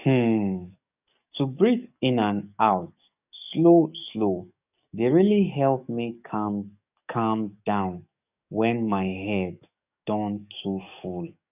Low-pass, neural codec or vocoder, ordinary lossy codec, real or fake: 3.6 kHz; codec, 44.1 kHz, 7.8 kbps, DAC; none; fake